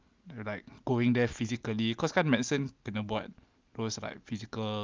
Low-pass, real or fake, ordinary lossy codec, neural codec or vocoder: 7.2 kHz; real; Opus, 24 kbps; none